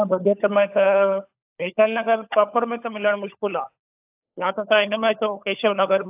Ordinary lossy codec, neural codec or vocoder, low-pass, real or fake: AAC, 32 kbps; codec, 16 kHz, 16 kbps, FunCodec, trained on LibriTTS, 50 frames a second; 3.6 kHz; fake